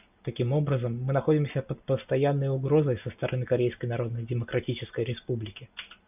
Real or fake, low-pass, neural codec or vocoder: real; 3.6 kHz; none